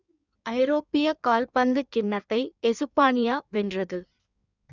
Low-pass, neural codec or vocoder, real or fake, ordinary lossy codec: 7.2 kHz; codec, 16 kHz in and 24 kHz out, 1.1 kbps, FireRedTTS-2 codec; fake; none